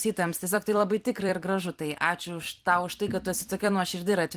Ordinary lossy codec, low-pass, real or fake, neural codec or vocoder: Opus, 24 kbps; 14.4 kHz; fake; vocoder, 44.1 kHz, 128 mel bands every 256 samples, BigVGAN v2